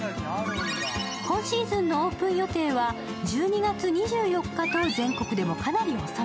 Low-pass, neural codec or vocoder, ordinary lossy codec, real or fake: none; none; none; real